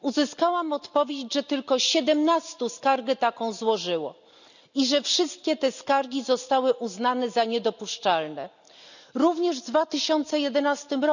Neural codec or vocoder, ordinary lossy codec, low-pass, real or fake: none; none; 7.2 kHz; real